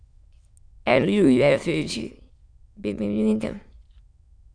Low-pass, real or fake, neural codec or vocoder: 9.9 kHz; fake; autoencoder, 22.05 kHz, a latent of 192 numbers a frame, VITS, trained on many speakers